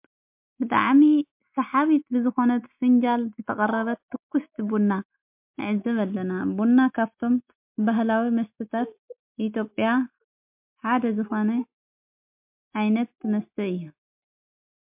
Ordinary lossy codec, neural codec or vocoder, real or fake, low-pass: MP3, 32 kbps; none; real; 3.6 kHz